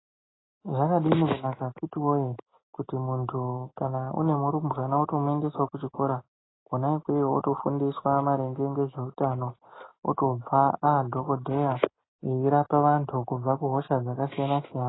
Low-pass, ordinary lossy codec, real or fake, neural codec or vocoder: 7.2 kHz; AAC, 16 kbps; real; none